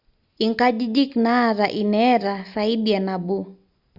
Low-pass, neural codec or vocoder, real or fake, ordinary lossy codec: 5.4 kHz; none; real; Opus, 64 kbps